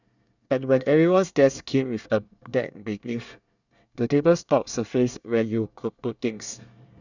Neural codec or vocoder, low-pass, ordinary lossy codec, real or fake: codec, 24 kHz, 1 kbps, SNAC; 7.2 kHz; none; fake